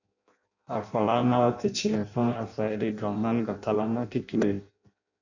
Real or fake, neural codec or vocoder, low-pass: fake; codec, 16 kHz in and 24 kHz out, 0.6 kbps, FireRedTTS-2 codec; 7.2 kHz